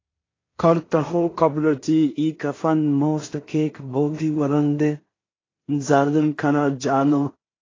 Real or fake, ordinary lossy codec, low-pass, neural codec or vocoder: fake; AAC, 32 kbps; 7.2 kHz; codec, 16 kHz in and 24 kHz out, 0.4 kbps, LongCat-Audio-Codec, two codebook decoder